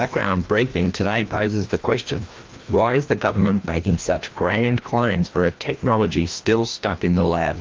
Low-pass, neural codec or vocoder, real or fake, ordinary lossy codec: 7.2 kHz; codec, 16 kHz, 1 kbps, FreqCodec, larger model; fake; Opus, 32 kbps